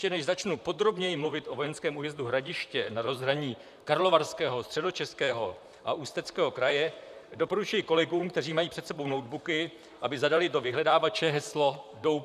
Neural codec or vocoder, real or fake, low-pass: vocoder, 44.1 kHz, 128 mel bands, Pupu-Vocoder; fake; 14.4 kHz